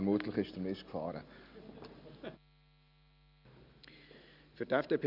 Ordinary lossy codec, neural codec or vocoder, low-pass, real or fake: none; none; 5.4 kHz; real